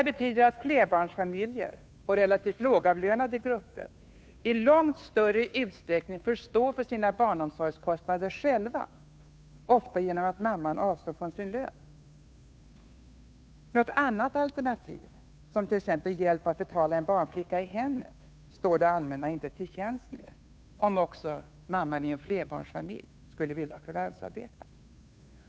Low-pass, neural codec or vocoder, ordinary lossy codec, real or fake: none; codec, 16 kHz, 2 kbps, FunCodec, trained on Chinese and English, 25 frames a second; none; fake